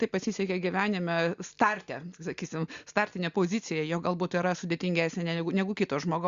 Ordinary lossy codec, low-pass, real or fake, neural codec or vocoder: Opus, 64 kbps; 7.2 kHz; real; none